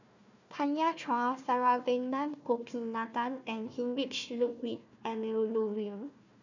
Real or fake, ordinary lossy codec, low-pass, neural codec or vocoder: fake; none; 7.2 kHz; codec, 16 kHz, 1 kbps, FunCodec, trained on Chinese and English, 50 frames a second